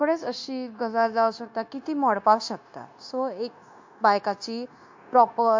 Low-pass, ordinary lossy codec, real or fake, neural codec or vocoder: 7.2 kHz; MP3, 48 kbps; fake; codec, 16 kHz, 0.9 kbps, LongCat-Audio-Codec